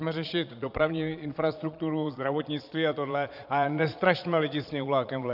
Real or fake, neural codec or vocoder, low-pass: fake; vocoder, 22.05 kHz, 80 mel bands, Vocos; 5.4 kHz